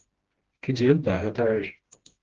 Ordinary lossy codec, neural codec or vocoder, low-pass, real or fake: Opus, 16 kbps; codec, 16 kHz, 1 kbps, FreqCodec, smaller model; 7.2 kHz; fake